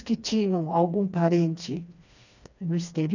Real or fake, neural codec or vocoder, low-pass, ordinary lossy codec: fake; codec, 16 kHz, 2 kbps, FreqCodec, smaller model; 7.2 kHz; none